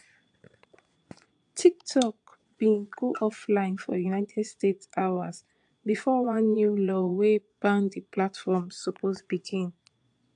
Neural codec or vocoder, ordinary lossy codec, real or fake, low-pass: vocoder, 22.05 kHz, 80 mel bands, Vocos; AAC, 64 kbps; fake; 9.9 kHz